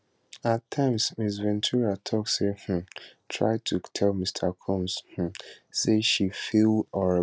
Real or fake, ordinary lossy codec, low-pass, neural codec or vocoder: real; none; none; none